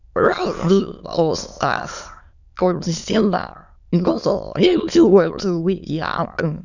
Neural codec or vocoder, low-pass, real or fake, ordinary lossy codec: autoencoder, 22.05 kHz, a latent of 192 numbers a frame, VITS, trained on many speakers; 7.2 kHz; fake; none